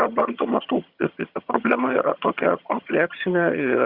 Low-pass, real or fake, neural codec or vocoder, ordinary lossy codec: 5.4 kHz; fake; vocoder, 22.05 kHz, 80 mel bands, HiFi-GAN; Opus, 64 kbps